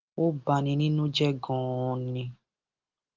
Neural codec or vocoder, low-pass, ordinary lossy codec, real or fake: none; 7.2 kHz; Opus, 32 kbps; real